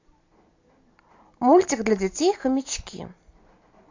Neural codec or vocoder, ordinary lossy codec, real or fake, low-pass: none; AAC, 48 kbps; real; 7.2 kHz